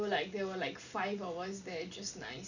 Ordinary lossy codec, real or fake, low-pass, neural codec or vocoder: none; real; 7.2 kHz; none